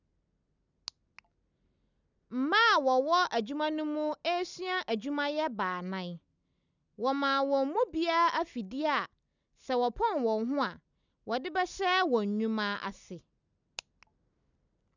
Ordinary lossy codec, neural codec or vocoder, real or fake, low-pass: none; none; real; 7.2 kHz